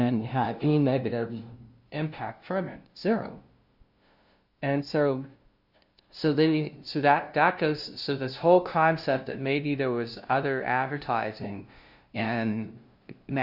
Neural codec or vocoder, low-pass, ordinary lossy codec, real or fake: codec, 16 kHz, 0.5 kbps, FunCodec, trained on LibriTTS, 25 frames a second; 5.4 kHz; Opus, 64 kbps; fake